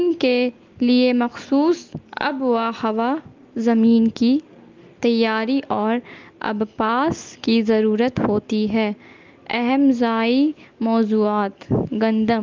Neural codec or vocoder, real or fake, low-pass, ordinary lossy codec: none; real; 7.2 kHz; Opus, 24 kbps